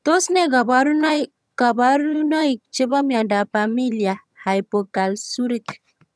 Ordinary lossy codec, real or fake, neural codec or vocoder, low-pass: none; fake; vocoder, 22.05 kHz, 80 mel bands, HiFi-GAN; none